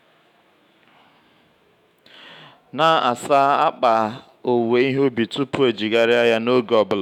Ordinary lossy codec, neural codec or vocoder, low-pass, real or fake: none; autoencoder, 48 kHz, 128 numbers a frame, DAC-VAE, trained on Japanese speech; 19.8 kHz; fake